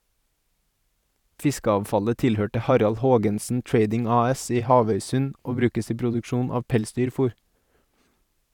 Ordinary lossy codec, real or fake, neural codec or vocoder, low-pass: none; fake; vocoder, 44.1 kHz, 128 mel bands every 512 samples, BigVGAN v2; 19.8 kHz